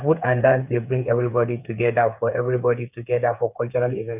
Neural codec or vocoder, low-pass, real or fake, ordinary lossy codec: codec, 16 kHz, 8 kbps, FreqCodec, smaller model; 3.6 kHz; fake; none